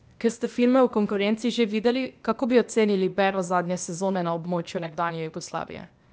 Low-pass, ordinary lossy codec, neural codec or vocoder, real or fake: none; none; codec, 16 kHz, 0.8 kbps, ZipCodec; fake